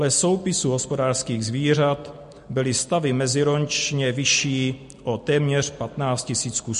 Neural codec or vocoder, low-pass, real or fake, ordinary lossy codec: none; 14.4 kHz; real; MP3, 48 kbps